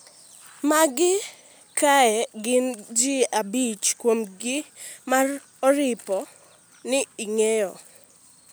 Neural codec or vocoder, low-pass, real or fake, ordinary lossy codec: none; none; real; none